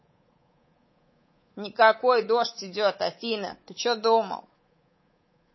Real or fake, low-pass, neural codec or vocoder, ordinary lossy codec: fake; 7.2 kHz; codec, 24 kHz, 3.1 kbps, DualCodec; MP3, 24 kbps